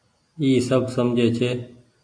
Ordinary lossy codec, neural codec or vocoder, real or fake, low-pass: AAC, 48 kbps; none; real; 9.9 kHz